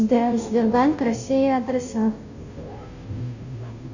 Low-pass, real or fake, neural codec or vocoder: 7.2 kHz; fake; codec, 16 kHz, 0.5 kbps, FunCodec, trained on Chinese and English, 25 frames a second